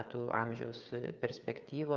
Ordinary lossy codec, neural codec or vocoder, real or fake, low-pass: Opus, 24 kbps; codec, 16 kHz, 4 kbps, FreqCodec, larger model; fake; 7.2 kHz